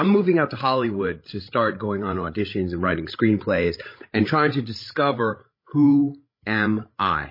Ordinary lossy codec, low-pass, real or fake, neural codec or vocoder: MP3, 24 kbps; 5.4 kHz; fake; codec, 16 kHz, 16 kbps, FreqCodec, larger model